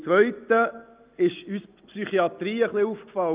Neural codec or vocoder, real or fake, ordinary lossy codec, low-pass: none; real; Opus, 32 kbps; 3.6 kHz